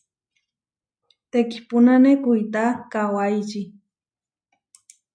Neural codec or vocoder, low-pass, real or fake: none; 9.9 kHz; real